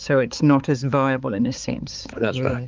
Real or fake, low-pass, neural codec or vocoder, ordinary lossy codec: fake; 7.2 kHz; codec, 16 kHz, 4 kbps, X-Codec, HuBERT features, trained on balanced general audio; Opus, 24 kbps